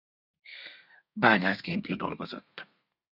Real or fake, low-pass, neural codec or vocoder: fake; 5.4 kHz; codec, 32 kHz, 1.9 kbps, SNAC